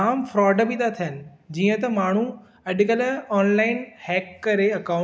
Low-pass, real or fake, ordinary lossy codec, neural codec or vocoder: none; real; none; none